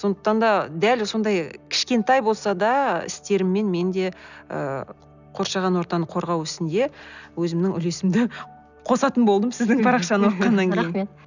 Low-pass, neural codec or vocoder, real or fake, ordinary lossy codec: 7.2 kHz; none; real; none